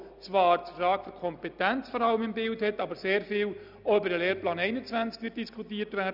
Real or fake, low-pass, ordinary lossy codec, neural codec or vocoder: real; 5.4 kHz; none; none